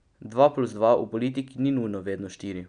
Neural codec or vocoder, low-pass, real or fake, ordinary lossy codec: none; 9.9 kHz; real; none